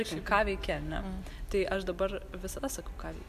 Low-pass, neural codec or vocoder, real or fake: 14.4 kHz; none; real